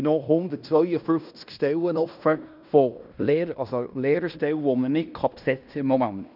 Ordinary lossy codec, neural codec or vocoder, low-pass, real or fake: none; codec, 16 kHz in and 24 kHz out, 0.9 kbps, LongCat-Audio-Codec, fine tuned four codebook decoder; 5.4 kHz; fake